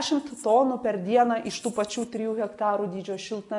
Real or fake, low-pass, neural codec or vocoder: real; 10.8 kHz; none